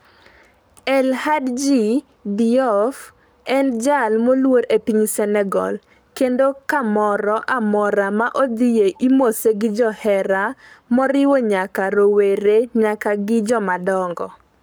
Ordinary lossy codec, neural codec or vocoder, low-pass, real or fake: none; codec, 44.1 kHz, 7.8 kbps, Pupu-Codec; none; fake